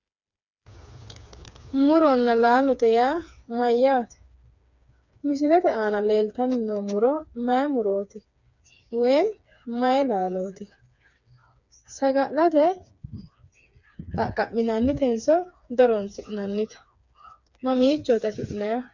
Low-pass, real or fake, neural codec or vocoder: 7.2 kHz; fake; codec, 16 kHz, 4 kbps, FreqCodec, smaller model